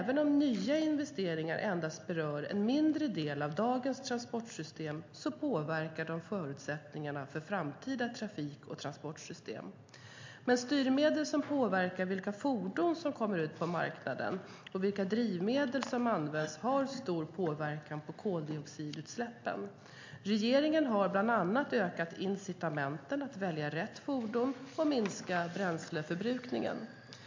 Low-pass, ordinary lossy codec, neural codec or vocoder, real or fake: 7.2 kHz; MP3, 48 kbps; none; real